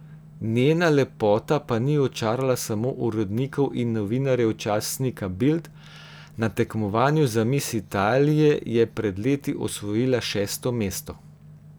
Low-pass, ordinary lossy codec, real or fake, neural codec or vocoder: none; none; real; none